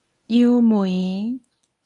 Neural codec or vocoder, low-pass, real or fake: codec, 24 kHz, 0.9 kbps, WavTokenizer, medium speech release version 1; 10.8 kHz; fake